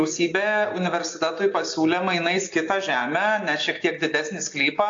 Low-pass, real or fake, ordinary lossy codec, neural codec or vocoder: 7.2 kHz; real; AAC, 48 kbps; none